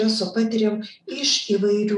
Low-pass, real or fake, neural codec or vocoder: 10.8 kHz; real; none